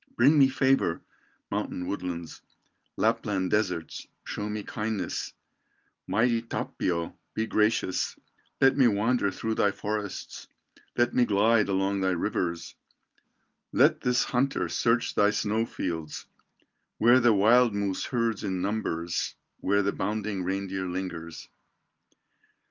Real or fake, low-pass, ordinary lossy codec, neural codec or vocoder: real; 7.2 kHz; Opus, 24 kbps; none